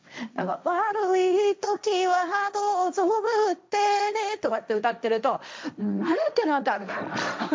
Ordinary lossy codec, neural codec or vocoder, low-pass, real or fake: none; codec, 16 kHz, 1.1 kbps, Voila-Tokenizer; none; fake